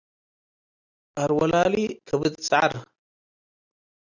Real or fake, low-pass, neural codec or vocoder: real; 7.2 kHz; none